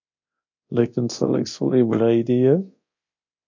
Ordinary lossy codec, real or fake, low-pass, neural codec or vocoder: AAC, 48 kbps; fake; 7.2 kHz; codec, 24 kHz, 0.9 kbps, DualCodec